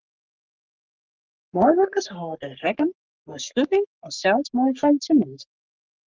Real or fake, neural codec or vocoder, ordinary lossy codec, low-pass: fake; codec, 44.1 kHz, 3.4 kbps, Pupu-Codec; Opus, 24 kbps; 7.2 kHz